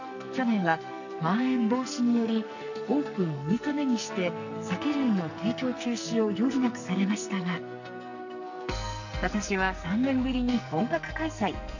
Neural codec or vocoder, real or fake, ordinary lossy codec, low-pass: codec, 44.1 kHz, 2.6 kbps, SNAC; fake; none; 7.2 kHz